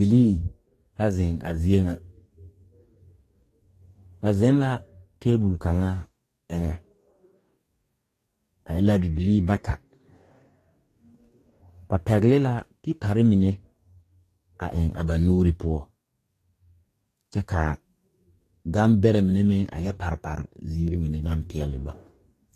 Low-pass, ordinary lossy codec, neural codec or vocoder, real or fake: 14.4 kHz; AAC, 48 kbps; codec, 44.1 kHz, 2.6 kbps, DAC; fake